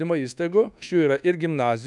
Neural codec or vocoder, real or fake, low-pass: codec, 24 kHz, 1.2 kbps, DualCodec; fake; 10.8 kHz